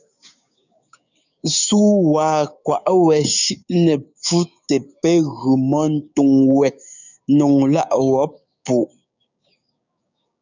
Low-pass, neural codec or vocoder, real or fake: 7.2 kHz; codec, 16 kHz, 6 kbps, DAC; fake